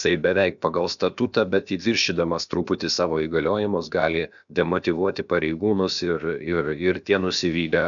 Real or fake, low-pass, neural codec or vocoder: fake; 7.2 kHz; codec, 16 kHz, about 1 kbps, DyCAST, with the encoder's durations